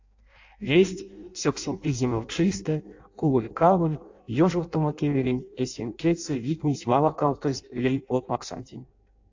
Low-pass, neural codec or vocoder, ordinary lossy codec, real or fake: 7.2 kHz; codec, 16 kHz in and 24 kHz out, 0.6 kbps, FireRedTTS-2 codec; AAC, 48 kbps; fake